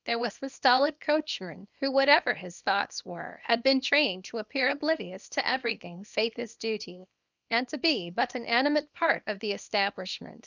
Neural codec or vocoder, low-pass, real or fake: codec, 24 kHz, 0.9 kbps, WavTokenizer, small release; 7.2 kHz; fake